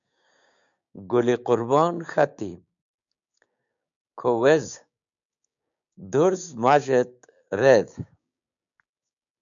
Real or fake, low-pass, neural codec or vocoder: fake; 7.2 kHz; codec, 16 kHz, 6 kbps, DAC